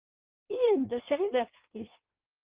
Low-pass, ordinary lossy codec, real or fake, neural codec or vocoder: 3.6 kHz; Opus, 32 kbps; fake; codec, 16 kHz in and 24 kHz out, 0.6 kbps, FireRedTTS-2 codec